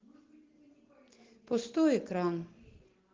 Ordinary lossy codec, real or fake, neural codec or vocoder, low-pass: Opus, 16 kbps; real; none; 7.2 kHz